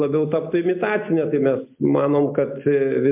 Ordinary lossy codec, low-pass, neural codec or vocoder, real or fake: MP3, 32 kbps; 3.6 kHz; none; real